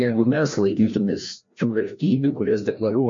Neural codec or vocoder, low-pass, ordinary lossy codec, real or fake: codec, 16 kHz, 1 kbps, FreqCodec, larger model; 7.2 kHz; MP3, 48 kbps; fake